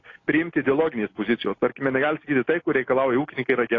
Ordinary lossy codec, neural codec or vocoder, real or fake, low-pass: AAC, 32 kbps; none; real; 7.2 kHz